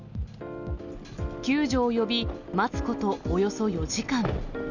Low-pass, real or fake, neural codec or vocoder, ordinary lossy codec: 7.2 kHz; real; none; none